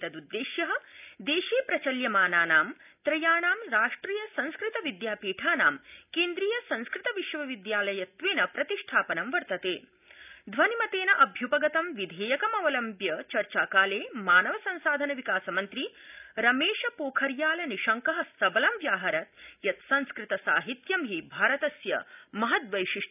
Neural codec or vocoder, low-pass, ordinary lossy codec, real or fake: none; 3.6 kHz; none; real